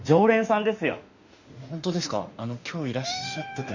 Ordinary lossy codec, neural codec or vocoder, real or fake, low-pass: Opus, 64 kbps; autoencoder, 48 kHz, 32 numbers a frame, DAC-VAE, trained on Japanese speech; fake; 7.2 kHz